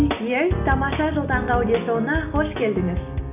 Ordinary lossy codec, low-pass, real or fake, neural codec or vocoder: none; 3.6 kHz; real; none